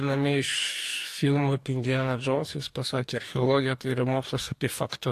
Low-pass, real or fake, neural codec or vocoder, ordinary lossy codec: 14.4 kHz; fake; codec, 44.1 kHz, 2.6 kbps, DAC; AAC, 64 kbps